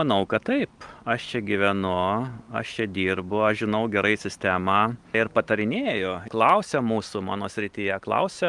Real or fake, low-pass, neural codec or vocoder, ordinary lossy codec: real; 10.8 kHz; none; Opus, 32 kbps